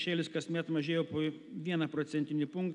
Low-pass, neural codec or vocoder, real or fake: 10.8 kHz; none; real